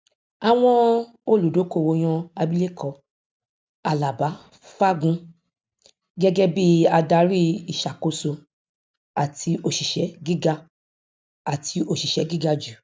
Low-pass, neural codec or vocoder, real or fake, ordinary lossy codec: none; none; real; none